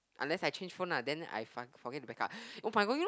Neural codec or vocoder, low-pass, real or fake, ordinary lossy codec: none; none; real; none